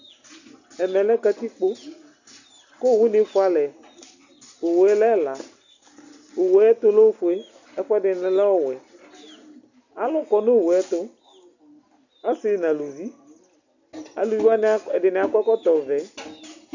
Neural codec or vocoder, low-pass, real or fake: none; 7.2 kHz; real